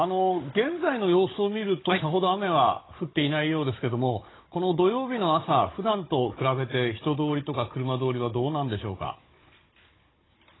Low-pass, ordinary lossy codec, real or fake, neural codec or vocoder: 7.2 kHz; AAC, 16 kbps; fake; codec, 16 kHz, 16 kbps, FunCodec, trained on Chinese and English, 50 frames a second